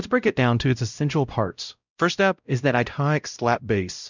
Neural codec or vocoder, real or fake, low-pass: codec, 16 kHz, 0.5 kbps, X-Codec, WavLM features, trained on Multilingual LibriSpeech; fake; 7.2 kHz